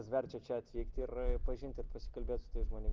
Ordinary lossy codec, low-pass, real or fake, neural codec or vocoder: Opus, 16 kbps; 7.2 kHz; real; none